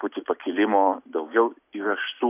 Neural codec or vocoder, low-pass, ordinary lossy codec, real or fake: none; 3.6 kHz; AAC, 24 kbps; real